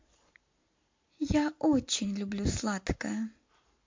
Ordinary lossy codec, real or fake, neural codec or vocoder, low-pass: MP3, 48 kbps; real; none; 7.2 kHz